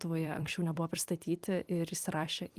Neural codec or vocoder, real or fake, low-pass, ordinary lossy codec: vocoder, 44.1 kHz, 128 mel bands every 256 samples, BigVGAN v2; fake; 14.4 kHz; Opus, 24 kbps